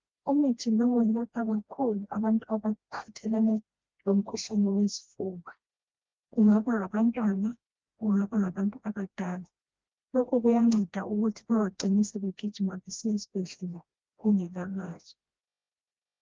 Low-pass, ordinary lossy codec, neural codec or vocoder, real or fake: 7.2 kHz; Opus, 24 kbps; codec, 16 kHz, 1 kbps, FreqCodec, smaller model; fake